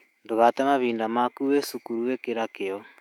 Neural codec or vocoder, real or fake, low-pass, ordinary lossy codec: autoencoder, 48 kHz, 128 numbers a frame, DAC-VAE, trained on Japanese speech; fake; 19.8 kHz; none